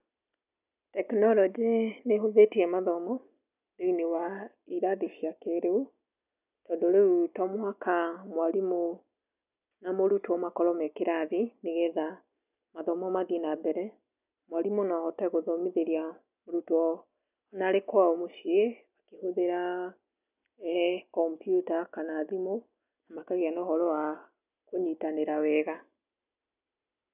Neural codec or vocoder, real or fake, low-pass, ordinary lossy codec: none; real; 3.6 kHz; none